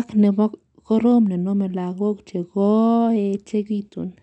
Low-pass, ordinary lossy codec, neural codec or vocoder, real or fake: 10.8 kHz; none; none; real